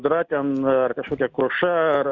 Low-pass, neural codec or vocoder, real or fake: 7.2 kHz; none; real